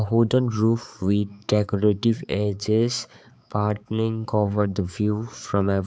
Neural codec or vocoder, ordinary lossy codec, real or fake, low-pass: codec, 16 kHz, 4 kbps, X-Codec, HuBERT features, trained on balanced general audio; none; fake; none